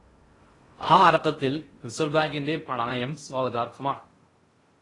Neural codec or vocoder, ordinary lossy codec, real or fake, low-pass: codec, 16 kHz in and 24 kHz out, 0.6 kbps, FocalCodec, streaming, 2048 codes; AAC, 32 kbps; fake; 10.8 kHz